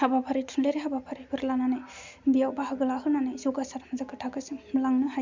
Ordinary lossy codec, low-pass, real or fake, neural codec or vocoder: MP3, 64 kbps; 7.2 kHz; real; none